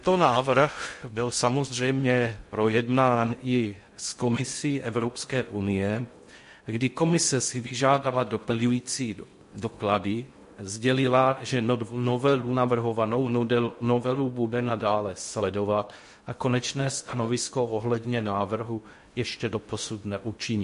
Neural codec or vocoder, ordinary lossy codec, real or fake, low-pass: codec, 16 kHz in and 24 kHz out, 0.6 kbps, FocalCodec, streaming, 2048 codes; MP3, 48 kbps; fake; 10.8 kHz